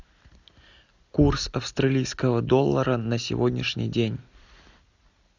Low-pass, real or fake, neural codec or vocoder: 7.2 kHz; real; none